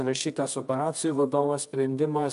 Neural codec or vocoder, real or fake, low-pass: codec, 24 kHz, 0.9 kbps, WavTokenizer, medium music audio release; fake; 10.8 kHz